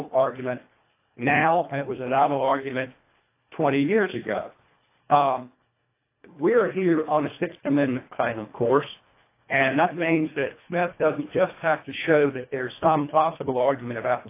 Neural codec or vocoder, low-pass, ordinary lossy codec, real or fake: codec, 24 kHz, 1.5 kbps, HILCodec; 3.6 kHz; AAC, 24 kbps; fake